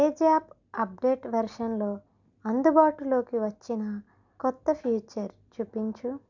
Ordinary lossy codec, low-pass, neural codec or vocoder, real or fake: none; 7.2 kHz; none; real